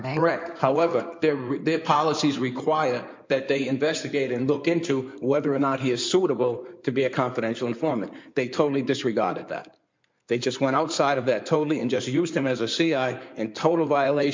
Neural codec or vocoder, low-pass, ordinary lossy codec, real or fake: codec, 16 kHz in and 24 kHz out, 2.2 kbps, FireRedTTS-2 codec; 7.2 kHz; MP3, 64 kbps; fake